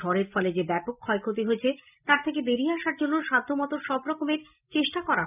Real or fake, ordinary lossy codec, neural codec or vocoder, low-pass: real; none; none; 3.6 kHz